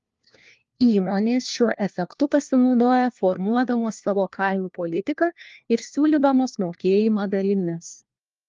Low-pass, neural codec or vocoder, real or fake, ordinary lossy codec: 7.2 kHz; codec, 16 kHz, 1 kbps, FunCodec, trained on LibriTTS, 50 frames a second; fake; Opus, 32 kbps